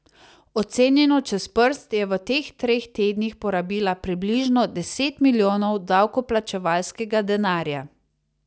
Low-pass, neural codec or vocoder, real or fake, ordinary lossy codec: none; none; real; none